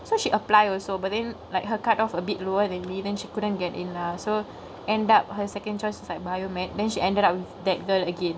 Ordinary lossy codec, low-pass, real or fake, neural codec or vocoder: none; none; real; none